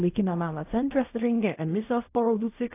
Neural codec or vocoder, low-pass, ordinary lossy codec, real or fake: codec, 16 kHz in and 24 kHz out, 0.4 kbps, LongCat-Audio-Codec, fine tuned four codebook decoder; 3.6 kHz; AAC, 24 kbps; fake